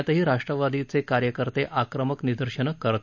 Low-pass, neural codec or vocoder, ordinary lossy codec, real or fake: 7.2 kHz; none; none; real